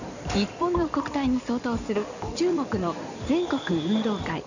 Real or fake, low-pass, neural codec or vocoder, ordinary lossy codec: fake; 7.2 kHz; codec, 16 kHz in and 24 kHz out, 2.2 kbps, FireRedTTS-2 codec; none